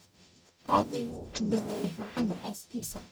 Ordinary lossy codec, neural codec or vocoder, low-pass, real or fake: none; codec, 44.1 kHz, 0.9 kbps, DAC; none; fake